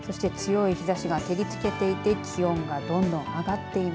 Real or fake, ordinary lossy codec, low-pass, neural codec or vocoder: real; none; none; none